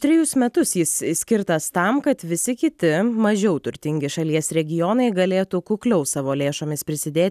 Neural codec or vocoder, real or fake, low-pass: none; real; 14.4 kHz